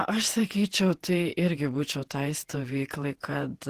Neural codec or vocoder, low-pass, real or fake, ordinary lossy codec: vocoder, 48 kHz, 128 mel bands, Vocos; 14.4 kHz; fake; Opus, 16 kbps